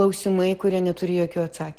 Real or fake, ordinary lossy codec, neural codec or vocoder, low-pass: real; Opus, 16 kbps; none; 14.4 kHz